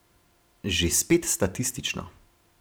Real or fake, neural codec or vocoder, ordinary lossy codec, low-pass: fake; vocoder, 44.1 kHz, 128 mel bands every 512 samples, BigVGAN v2; none; none